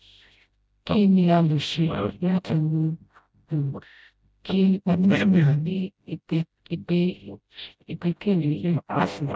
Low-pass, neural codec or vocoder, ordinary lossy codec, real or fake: none; codec, 16 kHz, 0.5 kbps, FreqCodec, smaller model; none; fake